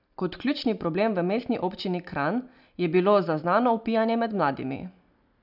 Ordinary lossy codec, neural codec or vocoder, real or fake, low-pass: none; none; real; 5.4 kHz